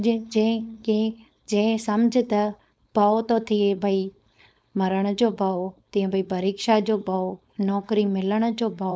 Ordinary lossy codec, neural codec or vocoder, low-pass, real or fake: none; codec, 16 kHz, 4.8 kbps, FACodec; none; fake